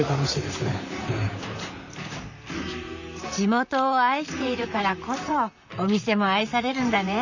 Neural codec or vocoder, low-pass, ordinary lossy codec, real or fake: vocoder, 44.1 kHz, 128 mel bands, Pupu-Vocoder; 7.2 kHz; none; fake